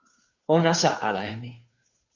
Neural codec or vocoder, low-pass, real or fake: codec, 16 kHz, 2 kbps, FunCodec, trained on Chinese and English, 25 frames a second; 7.2 kHz; fake